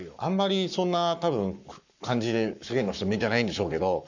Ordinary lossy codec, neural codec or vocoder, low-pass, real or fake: none; codec, 44.1 kHz, 7.8 kbps, Pupu-Codec; 7.2 kHz; fake